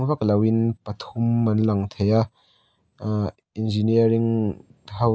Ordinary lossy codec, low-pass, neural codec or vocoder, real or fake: none; none; none; real